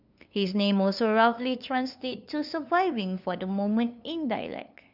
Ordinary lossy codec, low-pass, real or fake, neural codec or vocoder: none; 5.4 kHz; fake; codec, 16 kHz, 2 kbps, FunCodec, trained on LibriTTS, 25 frames a second